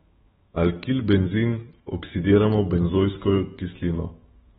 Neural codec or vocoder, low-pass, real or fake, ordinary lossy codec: autoencoder, 48 kHz, 128 numbers a frame, DAC-VAE, trained on Japanese speech; 19.8 kHz; fake; AAC, 16 kbps